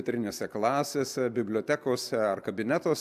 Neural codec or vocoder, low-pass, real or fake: none; 14.4 kHz; real